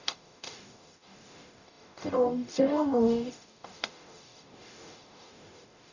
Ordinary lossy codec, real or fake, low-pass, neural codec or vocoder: none; fake; 7.2 kHz; codec, 44.1 kHz, 0.9 kbps, DAC